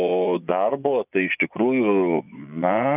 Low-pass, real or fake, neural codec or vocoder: 3.6 kHz; fake; vocoder, 24 kHz, 100 mel bands, Vocos